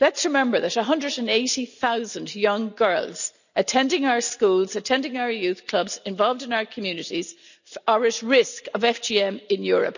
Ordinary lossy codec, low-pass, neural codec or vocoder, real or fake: none; 7.2 kHz; none; real